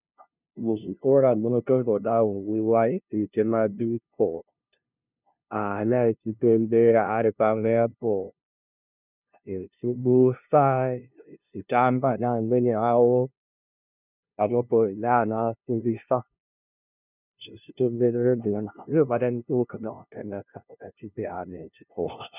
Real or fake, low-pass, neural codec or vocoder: fake; 3.6 kHz; codec, 16 kHz, 0.5 kbps, FunCodec, trained on LibriTTS, 25 frames a second